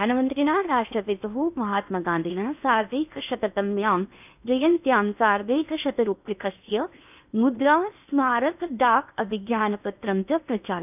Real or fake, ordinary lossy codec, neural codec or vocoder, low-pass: fake; none; codec, 16 kHz in and 24 kHz out, 0.8 kbps, FocalCodec, streaming, 65536 codes; 3.6 kHz